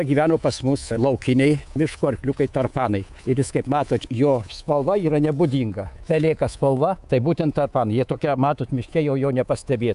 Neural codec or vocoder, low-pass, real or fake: codec, 24 kHz, 3.1 kbps, DualCodec; 10.8 kHz; fake